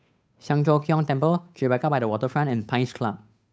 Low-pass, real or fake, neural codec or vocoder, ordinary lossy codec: none; fake; codec, 16 kHz, 8 kbps, FunCodec, trained on Chinese and English, 25 frames a second; none